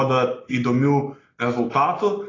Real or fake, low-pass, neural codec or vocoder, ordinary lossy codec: real; 7.2 kHz; none; AAC, 32 kbps